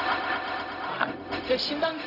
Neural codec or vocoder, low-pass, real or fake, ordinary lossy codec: codec, 16 kHz, 0.4 kbps, LongCat-Audio-Codec; 5.4 kHz; fake; none